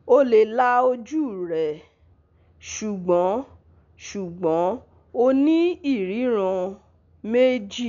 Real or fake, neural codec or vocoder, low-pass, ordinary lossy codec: real; none; 7.2 kHz; none